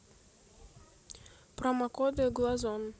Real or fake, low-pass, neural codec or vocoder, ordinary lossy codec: real; none; none; none